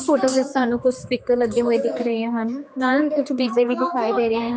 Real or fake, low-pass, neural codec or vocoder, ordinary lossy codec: fake; none; codec, 16 kHz, 2 kbps, X-Codec, HuBERT features, trained on general audio; none